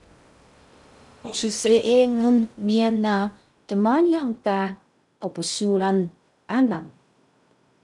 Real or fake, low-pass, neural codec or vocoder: fake; 10.8 kHz; codec, 16 kHz in and 24 kHz out, 0.6 kbps, FocalCodec, streaming, 2048 codes